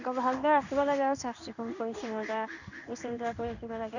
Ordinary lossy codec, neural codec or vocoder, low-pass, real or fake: none; codec, 16 kHz in and 24 kHz out, 1 kbps, XY-Tokenizer; 7.2 kHz; fake